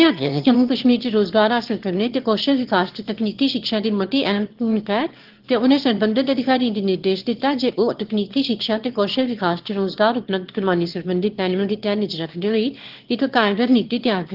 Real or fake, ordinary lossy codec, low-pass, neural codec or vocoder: fake; Opus, 16 kbps; 5.4 kHz; autoencoder, 22.05 kHz, a latent of 192 numbers a frame, VITS, trained on one speaker